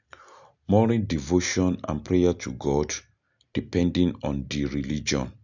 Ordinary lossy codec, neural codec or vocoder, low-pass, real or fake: none; none; 7.2 kHz; real